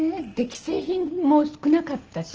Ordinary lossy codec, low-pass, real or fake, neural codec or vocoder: Opus, 16 kbps; 7.2 kHz; real; none